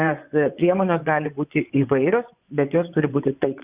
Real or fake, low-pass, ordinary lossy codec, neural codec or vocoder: fake; 3.6 kHz; Opus, 64 kbps; vocoder, 24 kHz, 100 mel bands, Vocos